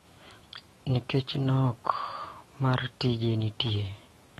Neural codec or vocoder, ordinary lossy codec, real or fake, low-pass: autoencoder, 48 kHz, 128 numbers a frame, DAC-VAE, trained on Japanese speech; AAC, 32 kbps; fake; 19.8 kHz